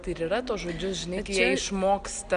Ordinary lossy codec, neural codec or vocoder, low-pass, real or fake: Opus, 64 kbps; none; 9.9 kHz; real